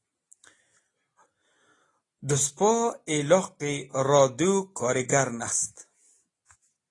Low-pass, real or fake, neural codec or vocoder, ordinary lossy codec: 10.8 kHz; real; none; AAC, 32 kbps